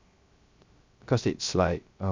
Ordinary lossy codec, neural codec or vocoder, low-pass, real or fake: MP3, 64 kbps; codec, 16 kHz, 0.3 kbps, FocalCodec; 7.2 kHz; fake